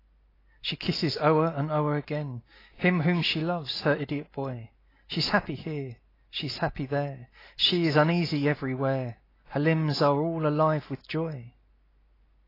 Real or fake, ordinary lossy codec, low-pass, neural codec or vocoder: real; AAC, 24 kbps; 5.4 kHz; none